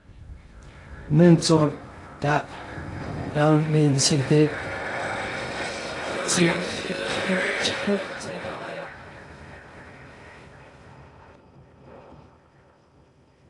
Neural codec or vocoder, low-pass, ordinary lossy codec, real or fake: codec, 16 kHz in and 24 kHz out, 0.6 kbps, FocalCodec, streaming, 2048 codes; 10.8 kHz; AAC, 48 kbps; fake